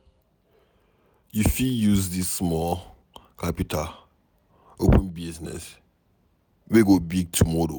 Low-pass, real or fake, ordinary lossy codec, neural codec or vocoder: none; real; none; none